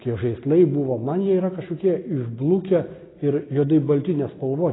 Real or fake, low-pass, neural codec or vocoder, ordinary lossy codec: real; 7.2 kHz; none; AAC, 16 kbps